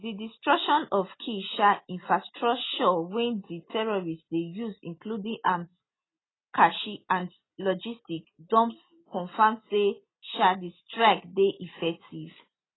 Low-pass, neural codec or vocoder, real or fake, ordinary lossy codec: 7.2 kHz; none; real; AAC, 16 kbps